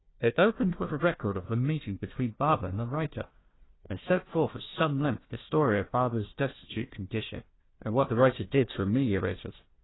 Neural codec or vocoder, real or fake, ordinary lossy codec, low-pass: codec, 16 kHz, 1 kbps, FunCodec, trained on Chinese and English, 50 frames a second; fake; AAC, 16 kbps; 7.2 kHz